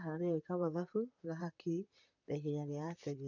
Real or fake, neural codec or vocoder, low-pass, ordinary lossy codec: fake; vocoder, 44.1 kHz, 128 mel bands, Pupu-Vocoder; 7.2 kHz; none